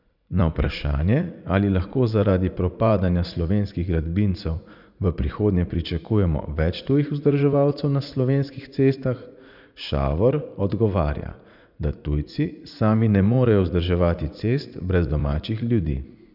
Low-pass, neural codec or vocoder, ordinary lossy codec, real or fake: 5.4 kHz; vocoder, 44.1 kHz, 80 mel bands, Vocos; none; fake